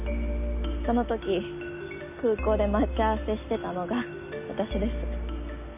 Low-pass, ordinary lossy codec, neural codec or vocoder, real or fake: 3.6 kHz; none; none; real